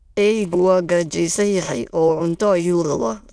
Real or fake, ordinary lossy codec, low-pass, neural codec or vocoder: fake; none; none; autoencoder, 22.05 kHz, a latent of 192 numbers a frame, VITS, trained on many speakers